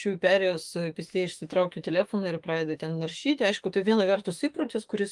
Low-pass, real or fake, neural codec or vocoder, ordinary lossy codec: 10.8 kHz; fake; autoencoder, 48 kHz, 32 numbers a frame, DAC-VAE, trained on Japanese speech; Opus, 24 kbps